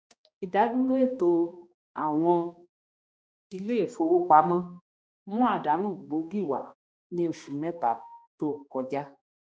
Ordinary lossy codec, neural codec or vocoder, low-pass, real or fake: none; codec, 16 kHz, 1 kbps, X-Codec, HuBERT features, trained on balanced general audio; none; fake